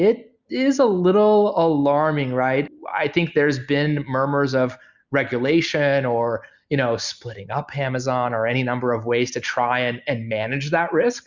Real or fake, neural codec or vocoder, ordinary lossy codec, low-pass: real; none; Opus, 64 kbps; 7.2 kHz